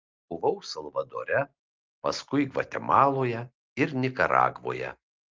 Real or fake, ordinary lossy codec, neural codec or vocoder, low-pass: real; Opus, 32 kbps; none; 7.2 kHz